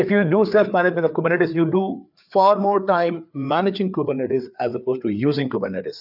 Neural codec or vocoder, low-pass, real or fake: codec, 16 kHz, 4 kbps, FreqCodec, larger model; 5.4 kHz; fake